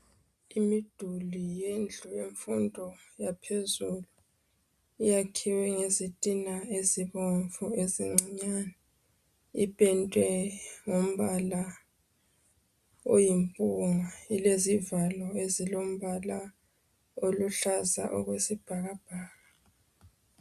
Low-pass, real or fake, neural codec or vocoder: 14.4 kHz; real; none